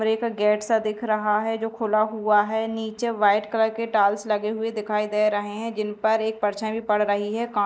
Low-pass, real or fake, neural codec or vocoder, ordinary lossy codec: none; real; none; none